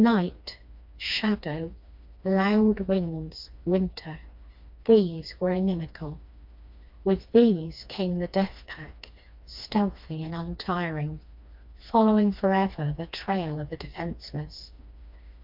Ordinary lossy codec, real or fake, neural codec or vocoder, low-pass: MP3, 48 kbps; fake; codec, 16 kHz, 2 kbps, FreqCodec, smaller model; 5.4 kHz